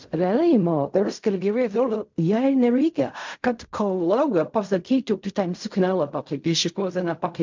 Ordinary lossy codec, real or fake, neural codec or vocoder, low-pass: MP3, 64 kbps; fake; codec, 16 kHz in and 24 kHz out, 0.4 kbps, LongCat-Audio-Codec, fine tuned four codebook decoder; 7.2 kHz